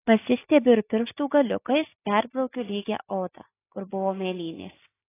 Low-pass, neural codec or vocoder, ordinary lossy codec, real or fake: 3.6 kHz; none; AAC, 16 kbps; real